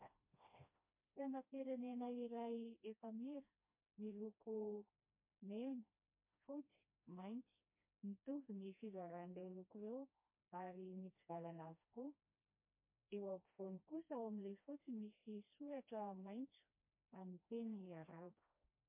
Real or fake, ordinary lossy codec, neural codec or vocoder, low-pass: fake; MP3, 24 kbps; codec, 16 kHz, 1 kbps, FreqCodec, smaller model; 3.6 kHz